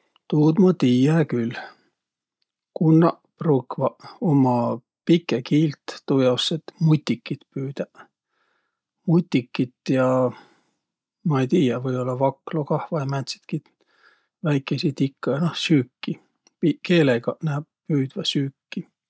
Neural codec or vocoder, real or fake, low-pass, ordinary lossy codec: none; real; none; none